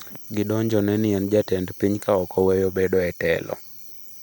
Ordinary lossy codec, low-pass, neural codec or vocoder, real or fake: none; none; none; real